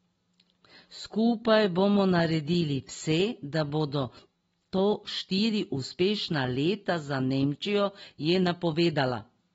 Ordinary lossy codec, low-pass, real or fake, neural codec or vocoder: AAC, 24 kbps; 19.8 kHz; real; none